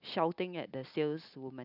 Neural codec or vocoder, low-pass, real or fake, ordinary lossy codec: none; 5.4 kHz; real; none